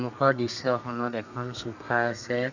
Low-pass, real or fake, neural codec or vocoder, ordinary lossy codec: 7.2 kHz; fake; codec, 32 kHz, 1.9 kbps, SNAC; none